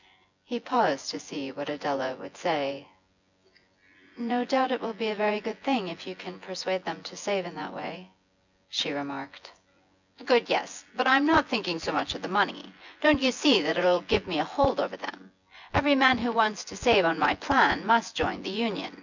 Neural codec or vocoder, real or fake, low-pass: vocoder, 24 kHz, 100 mel bands, Vocos; fake; 7.2 kHz